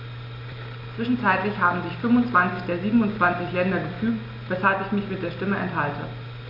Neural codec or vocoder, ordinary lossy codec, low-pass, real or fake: none; MP3, 48 kbps; 5.4 kHz; real